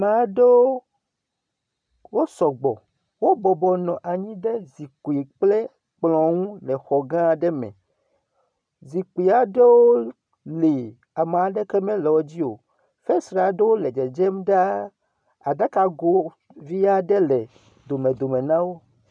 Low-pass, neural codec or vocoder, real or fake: 9.9 kHz; none; real